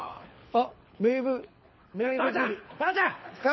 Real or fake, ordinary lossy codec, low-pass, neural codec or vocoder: fake; MP3, 24 kbps; 7.2 kHz; codec, 24 kHz, 6 kbps, HILCodec